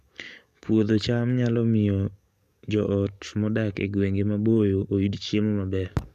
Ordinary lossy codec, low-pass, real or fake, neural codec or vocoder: none; 14.4 kHz; fake; codec, 44.1 kHz, 7.8 kbps, DAC